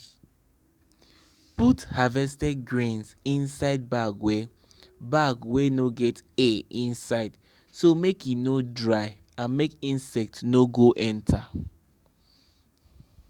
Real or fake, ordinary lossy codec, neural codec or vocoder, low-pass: fake; Opus, 64 kbps; codec, 44.1 kHz, 7.8 kbps, DAC; 19.8 kHz